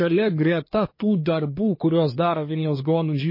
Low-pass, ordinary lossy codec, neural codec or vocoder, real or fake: 5.4 kHz; MP3, 24 kbps; codec, 24 kHz, 1 kbps, SNAC; fake